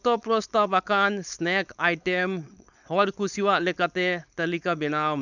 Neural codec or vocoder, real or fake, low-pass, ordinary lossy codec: codec, 16 kHz, 4.8 kbps, FACodec; fake; 7.2 kHz; none